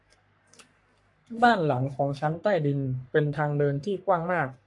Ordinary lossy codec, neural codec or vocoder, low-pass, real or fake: AAC, 64 kbps; codec, 44.1 kHz, 3.4 kbps, Pupu-Codec; 10.8 kHz; fake